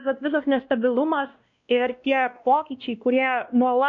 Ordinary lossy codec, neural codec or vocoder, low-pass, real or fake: AAC, 64 kbps; codec, 16 kHz, 1 kbps, X-Codec, WavLM features, trained on Multilingual LibriSpeech; 7.2 kHz; fake